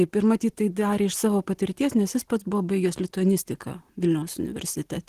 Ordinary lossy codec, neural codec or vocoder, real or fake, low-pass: Opus, 16 kbps; none; real; 14.4 kHz